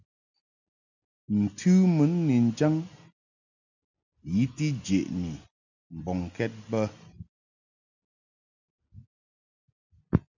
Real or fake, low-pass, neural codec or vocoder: real; 7.2 kHz; none